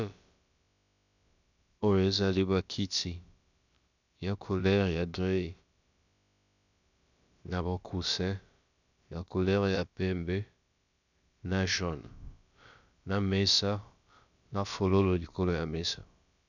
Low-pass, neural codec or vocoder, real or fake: 7.2 kHz; codec, 16 kHz, about 1 kbps, DyCAST, with the encoder's durations; fake